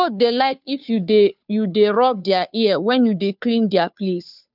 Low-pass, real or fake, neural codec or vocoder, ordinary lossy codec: 5.4 kHz; fake; codec, 16 kHz, 2 kbps, FunCodec, trained on Chinese and English, 25 frames a second; none